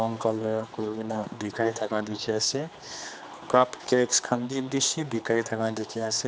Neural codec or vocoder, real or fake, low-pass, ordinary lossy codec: codec, 16 kHz, 2 kbps, X-Codec, HuBERT features, trained on general audio; fake; none; none